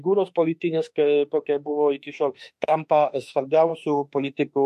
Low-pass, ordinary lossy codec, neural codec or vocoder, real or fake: 14.4 kHz; MP3, 64 kbps; autoencoder, 48 kHz, 32 numbers a frame, DAC-VAE, trained on Japanese speech; fake